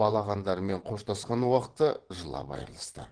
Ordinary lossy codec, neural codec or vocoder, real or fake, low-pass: Opus, 16 kbps; vocoder, 22.05 kHz, 80 mel bands, WaveNeXt; fake; 9.9 kHz